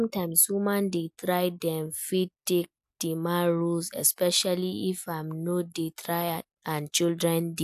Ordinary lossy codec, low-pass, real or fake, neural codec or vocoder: AAC, 96 kbps; 14.4 kHz; real; none